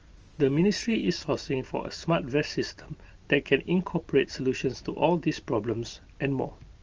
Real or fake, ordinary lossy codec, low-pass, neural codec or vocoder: real; Opus, 24 kbps; 7.2 kHz; none